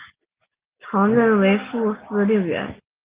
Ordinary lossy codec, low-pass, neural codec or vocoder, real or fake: Opus, 32 kbps; 3.6 kHz; none; real